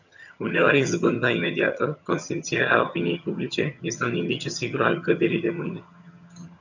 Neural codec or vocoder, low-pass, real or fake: vocoder, 22.05 kHz, 80 mel bands, HiFi-GAN; 7.2 kHz; fake